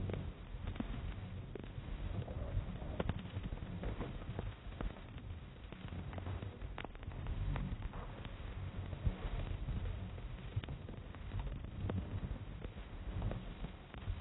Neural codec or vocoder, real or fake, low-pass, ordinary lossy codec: codec, 16 kHz, 1 kbps, X-Codec, HuBERT features, trained on balanced general audio; fake; 7.2 kHz; AAC, 16 kbps